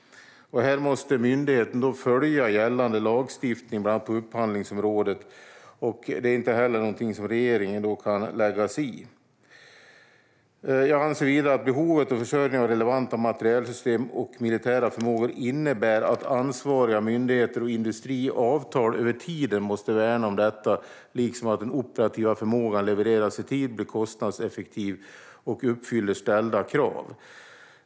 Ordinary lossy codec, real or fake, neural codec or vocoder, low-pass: none; real; none; none